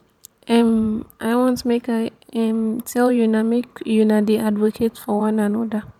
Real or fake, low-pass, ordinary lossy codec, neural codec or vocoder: fake; 19.8 kHz; none; vocoder, 44.1 kHz, 128 mel bands every 512 samples, BigVGAN v2